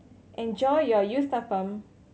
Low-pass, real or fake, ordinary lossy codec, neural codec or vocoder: none; real; none; none